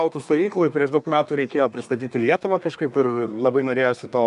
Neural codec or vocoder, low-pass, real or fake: codec, 24 kHz, 1 kbps, SNAC; 10.8 kHz; fake